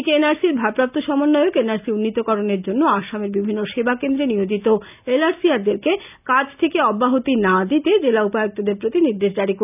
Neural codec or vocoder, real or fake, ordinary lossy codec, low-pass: none; real; none; 3.6 kHz